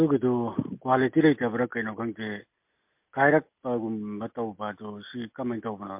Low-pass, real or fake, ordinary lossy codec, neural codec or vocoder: 3.6 kHz; real; MP3, 32 kbps; none